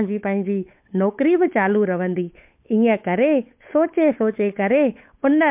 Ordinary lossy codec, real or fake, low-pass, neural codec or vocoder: MP3, 32 kbps; fake; 3.6 kHz; codec, 16 kHz, 8 kbps, FunCodec, trained on Chinese and English, 25 frames a second